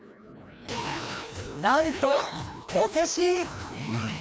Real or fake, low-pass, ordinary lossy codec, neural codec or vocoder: fake; none; none; codec, 16 kHz, 1 kbps, FreqCodec, larger model